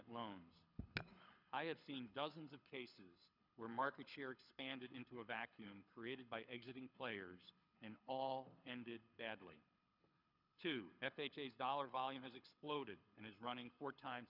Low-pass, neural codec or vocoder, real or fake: 5.4 kHz; codec, 24 kHz, 6 kbps, HILCodec; fake